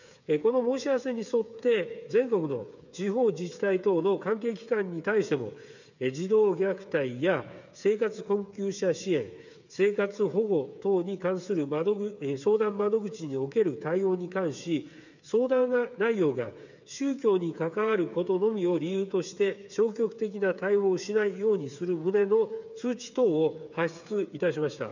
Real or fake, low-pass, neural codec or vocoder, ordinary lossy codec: fake; 7.2 kHz; codec, 16 kHz, 8 kbps, FreqCodec, smaller model; none